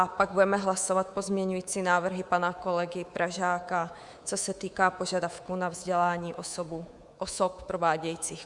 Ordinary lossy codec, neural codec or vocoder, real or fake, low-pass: Opus, 64 kbps; codec, 24 kHz, 3.1 kbps, DualCodec; fake; 10.8 kHz